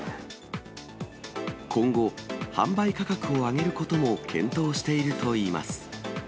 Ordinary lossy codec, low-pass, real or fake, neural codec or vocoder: none; none; real; none